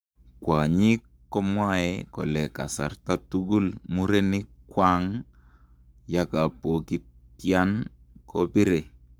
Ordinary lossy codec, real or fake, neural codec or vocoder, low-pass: none; fake; codec, 44.1 kHz, 7.8 kbps, Pupu-Codec; none